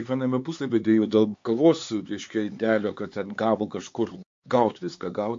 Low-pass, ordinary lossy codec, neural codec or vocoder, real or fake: 7.2 kHz; MP3, 48 kbps; codec, 16 kHz, 4 kbps, X-Codec, HuBERT features, trained on LibriSpeech; fake